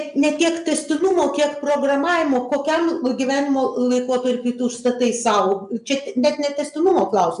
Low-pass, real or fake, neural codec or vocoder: 10.8 kHz; real; none